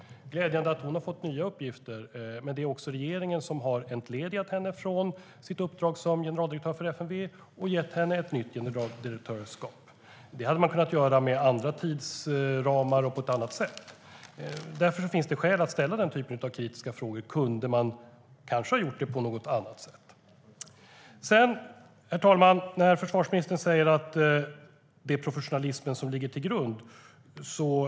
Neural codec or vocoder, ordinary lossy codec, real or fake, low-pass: none; none; real; none